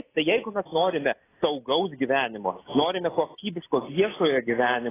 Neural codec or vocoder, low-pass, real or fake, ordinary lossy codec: none; 3.6 kHz; real; AAC, 16 kbps